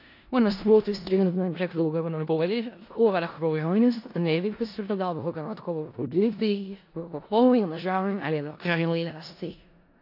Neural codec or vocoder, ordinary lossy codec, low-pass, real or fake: codec, 16 kHz in and 24 kHz out, 0.4 kbps, LongCat-Audio-Codec, four codebook decoder; AAC, 32 kbps; 5.4 kHz; fake